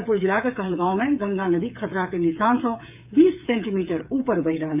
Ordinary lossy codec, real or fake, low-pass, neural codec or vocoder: none; fake; 3.6 kHz; codec, 16 kHz, 8 kbps, FreqCodec, smaller model